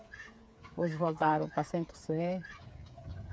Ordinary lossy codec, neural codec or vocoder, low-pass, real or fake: none; codec, 16 kHz, 8 kbps, FreqCodec, smaller model; none; fake